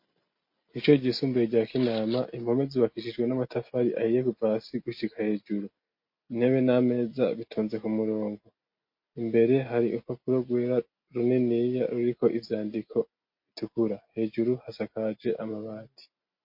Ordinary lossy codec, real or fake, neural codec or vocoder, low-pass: MP3, 32 kbps; real; none; 5.4 kHz